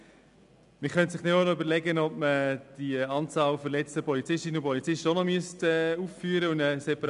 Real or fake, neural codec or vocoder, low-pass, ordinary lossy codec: real; none; 10.8 kHz; none